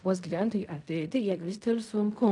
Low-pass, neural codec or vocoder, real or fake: 10.8 kHz; codec, 16 kHz in and 24 kHz out, 0.4 kbps, LongCat-Audio-Codec, fine tuned four codebook decoder; fake